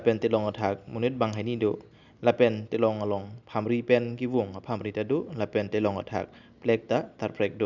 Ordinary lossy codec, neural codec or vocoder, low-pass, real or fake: none; none; 7.2 kHz; real